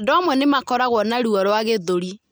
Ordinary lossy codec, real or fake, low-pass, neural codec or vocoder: none; real; none; none